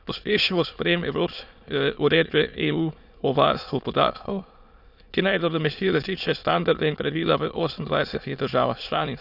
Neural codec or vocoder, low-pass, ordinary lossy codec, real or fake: autoencoder, 22.05 kHz, a latent of 192 numbers a frame, VITS, trained on many speakers; 5.4 kHz; none; fake